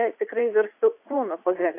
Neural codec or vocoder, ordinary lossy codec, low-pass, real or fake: vocoder, 44.1 kHz, 80 mel bands, Vocos; AAC, 24 kbps; 3.6 kHz; fake